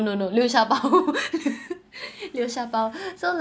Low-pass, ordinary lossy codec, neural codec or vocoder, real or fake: none; none; none; real